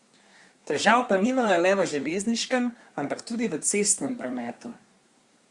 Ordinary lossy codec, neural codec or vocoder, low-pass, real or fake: Opus, 64 kbps; codec, 24 kHz, 1 kbps, SNAC; 10.8 kHz; fake